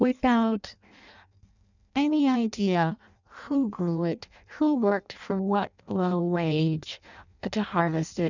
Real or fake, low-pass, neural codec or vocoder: fake; 7.2 kHz; codec, 16 kHz in and 24 kHz out, 0.6 kbps, FireRedTTS-2 codec